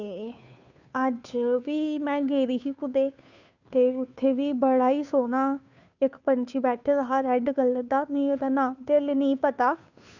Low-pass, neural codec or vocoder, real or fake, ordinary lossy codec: 7.2 kHz; codec, 16 kHz, 2 kbps, FunCodec, trained on Chinese and English, 25 frames a second; fake; none